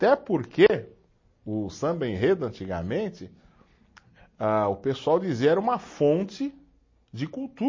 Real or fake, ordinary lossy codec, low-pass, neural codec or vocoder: real; MP3, 32 kbps; 7.2 kHz; none